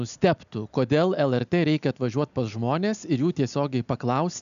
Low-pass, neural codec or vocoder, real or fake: 7.2 kHz; none; real